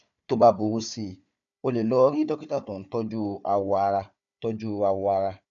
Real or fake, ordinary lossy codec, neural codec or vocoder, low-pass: fake; none; codec, 16 kHz, 4 kbps, FunCodec, trained on Chinese and English, 50 frames a second; 7.2 kHz